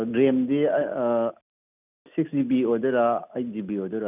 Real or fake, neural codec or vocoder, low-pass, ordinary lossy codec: real; none; 3.6 kHz; none